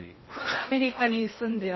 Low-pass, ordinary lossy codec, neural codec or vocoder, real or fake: 7.2 kHz; MP3, 24 kbps; codec, 16 kHz in and 24 kHz out, 0.8 kbps, FocalCodec, streaming, 65536 codes; fake